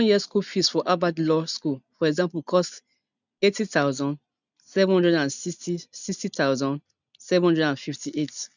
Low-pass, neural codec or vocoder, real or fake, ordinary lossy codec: 7.2 kHz; none; real; none